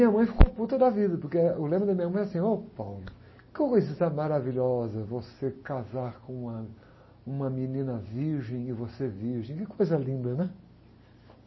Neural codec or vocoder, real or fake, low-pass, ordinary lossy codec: none; real; 7.2 kHz; MP3, 24 kbps